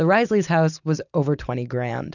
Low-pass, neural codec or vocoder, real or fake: 7.2 kHz; none; real